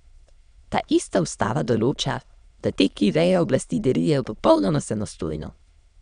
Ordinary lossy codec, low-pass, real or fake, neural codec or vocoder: none; 9.9 kHz; fake; autoencoder, 22.05 kHz, a latent of 192 numbers a frame, VITS, trained on many speakers